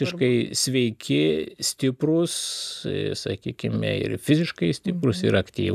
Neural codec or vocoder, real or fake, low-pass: none; real; 14.4 kHz